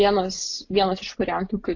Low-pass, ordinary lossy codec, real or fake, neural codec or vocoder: 7.2 kHz; AAC, 48 kbps; real; none